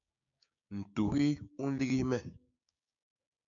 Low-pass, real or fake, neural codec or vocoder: 7.2 kHz; fake; codec, 16 kHz, 6 kbps, DAC